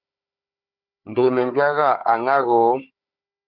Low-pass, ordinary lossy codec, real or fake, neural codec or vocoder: 5.4 kHz; Opus, 64 kbps; fake; codec, 16 kHz, 4 kbps, FunCodec, trained on Chinese and English, 50 frames a second